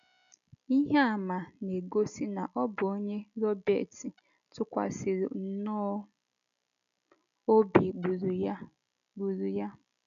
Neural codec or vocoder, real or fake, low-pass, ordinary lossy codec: none; real; 7.2 kHz; none